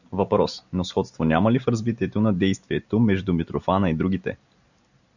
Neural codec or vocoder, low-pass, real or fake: none; 7.2 kHz; real